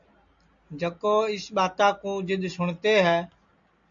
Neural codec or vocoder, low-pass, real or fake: none; 7.2 kHz; real